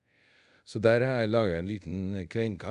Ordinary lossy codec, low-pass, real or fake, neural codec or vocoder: MP3, 96 kbps; 10.8 kHz; fake; codec, 24 kHz, 0.5 kbps, DualCodec